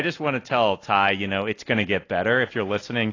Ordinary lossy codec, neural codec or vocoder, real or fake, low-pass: AAC, 32 kbps; none; real; 7.2 kHz